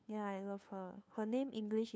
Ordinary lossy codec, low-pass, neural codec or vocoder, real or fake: none; none; codec, 16 kHz, 2 kbps, FunCodec, trained on LibriTTS, 25 frames a second; fake